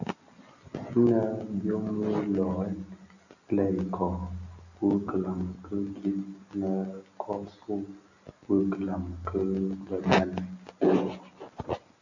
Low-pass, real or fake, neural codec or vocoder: 7.2 kHz; real; none